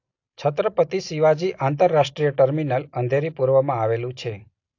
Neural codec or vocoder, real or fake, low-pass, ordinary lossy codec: none; real; 7.2 kHz; AAC, 48 kbps